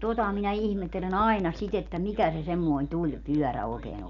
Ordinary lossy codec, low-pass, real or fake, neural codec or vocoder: none; 7.2 kHz; real; none